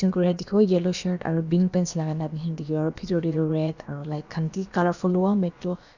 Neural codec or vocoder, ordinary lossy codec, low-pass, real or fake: codec, 16 kHz, about 1 kbps, DyCAST, with the encoder's durations; none; 7.2 kHz; fake